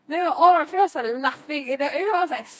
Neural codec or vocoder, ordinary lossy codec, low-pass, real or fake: codec, 16 kHz, 2 kbps, FreqCodec, smaller model; none; none; fake